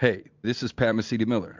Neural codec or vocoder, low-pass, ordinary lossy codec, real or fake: none; 7.2 kHz; MP3, 64 kbps; real